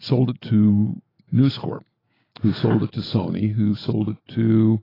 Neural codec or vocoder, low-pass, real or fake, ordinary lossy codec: none; 5.4 kHz; real; AAC, 24 kbps